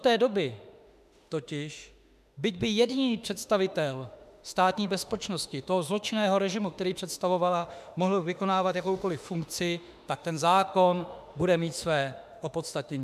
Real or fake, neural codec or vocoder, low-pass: fake; autoencoder, 48 kHz, 32 numbers a frame, DAC-VAE, trained on Japanese speech; 14.4 kHz